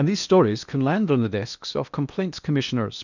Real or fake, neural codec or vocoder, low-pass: fake; codec, 16 kHz in and 24 kHz out, 0.8 kbps, FocalCodec, streaming, 65536 codes; 7.2 kHz